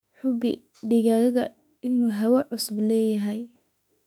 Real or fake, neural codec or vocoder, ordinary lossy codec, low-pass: fake; autoencoder, 48 kHz, 32 numbers a frame, DAC-VAE, trained on Japanese speech; none; 19.8 kHz